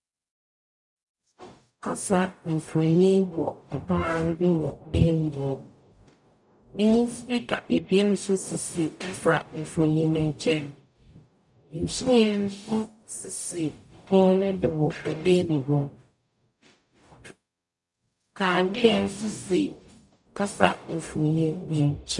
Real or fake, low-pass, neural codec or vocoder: fake; 10.8 kHz; codec, 44.1 kHz, 0.9 kbps, DAC